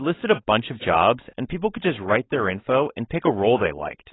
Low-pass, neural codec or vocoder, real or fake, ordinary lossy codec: 7.2 kHz; codec, 16 kHz, 4.8 kbps, FACodec; fake; AAC, 16 kbps